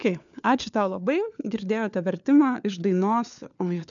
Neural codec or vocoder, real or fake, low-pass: codec, 16 kHz, 4 kbps, FunCodec, trained on LibriTTS, 50 frames a second; fake; 7.2 kHz